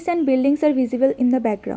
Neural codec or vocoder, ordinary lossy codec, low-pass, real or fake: none; none; none; real